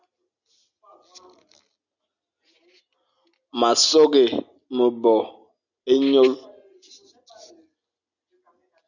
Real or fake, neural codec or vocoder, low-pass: real; none; 7.2 kHz